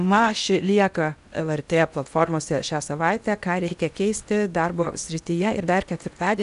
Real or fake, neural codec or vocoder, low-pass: fake; codec, 16 kHz in and 24 kHz out, 0.8 kbps, FocalCodec, streaming, 65536 codes; 10.8 kHz